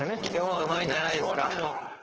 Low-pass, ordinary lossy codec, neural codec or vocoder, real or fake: 7.2 kHz; Opus, 16 kbps; codec, 16 kHz, 4.8 kbps, FACodec; fake